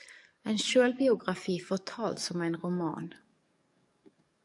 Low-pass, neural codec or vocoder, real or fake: 10.8 kHz; codec, 44.1 kHz, 7.8 kbps, DAC; fake